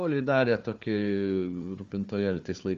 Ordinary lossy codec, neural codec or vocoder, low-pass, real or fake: Opus, 32 kbps; codec, 16 kHz, 4 kbps, FunCodec, trained on Chinese and English, 50 frames a second; 7.2 kHz; fake